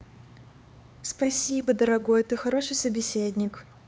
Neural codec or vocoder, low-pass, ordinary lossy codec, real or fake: codec, 16 kHz, 4 kbps, X-Codec, HuBERT features, trained on LibriSpeech; none; none; fake